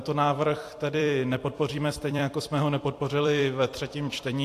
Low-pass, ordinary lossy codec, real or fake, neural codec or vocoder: 14.4 kHz; AAC, 64 kbps; fake; vocoder, 44.1 kHz, 128 mel bands every 256 samples, BigVGAN v2